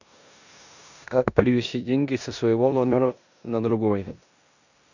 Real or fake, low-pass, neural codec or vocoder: fake; 7.2 kHz; codec, 16 kHz in and 24 kHz out, 0.9 kbps, LongCat-Audio-Codec, four codebook decoder